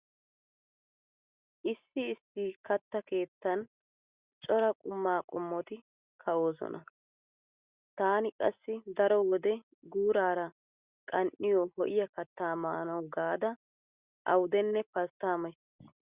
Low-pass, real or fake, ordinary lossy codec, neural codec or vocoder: 3.6 kHz; real; Opus, 64 kbps; none